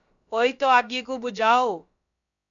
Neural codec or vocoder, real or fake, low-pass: codec, 16 kHz, about 1 kbps, DyCAST, with the encoder's durations; fake; 7.2 kHz